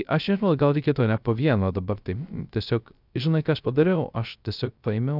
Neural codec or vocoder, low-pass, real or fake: codec, 16 kHz, 0.3 kbps, FocalCodec; 5.4 kHz; fake